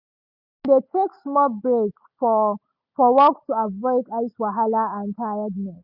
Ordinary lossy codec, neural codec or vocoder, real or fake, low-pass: none; none; real; 5.4 kHz